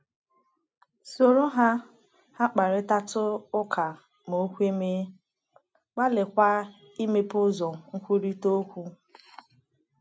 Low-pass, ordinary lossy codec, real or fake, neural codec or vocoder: none; none; real; none